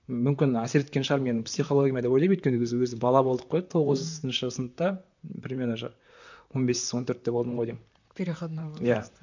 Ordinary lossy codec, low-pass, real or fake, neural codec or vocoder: none; 7.2 kHz; fake; vocoder, 44.1 kHz, 128 mel bands, Pupu-Vocoder